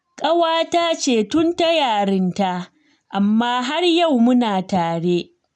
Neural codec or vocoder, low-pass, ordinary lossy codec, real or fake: none; none; none; real